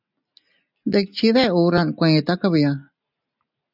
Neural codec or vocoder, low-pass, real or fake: none; 5.4 kHz; real